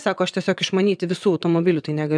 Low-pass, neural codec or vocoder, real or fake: 9.9 kHz; vocoder, 22.05 kHz, 80 mel bands, WaveNeXt; fake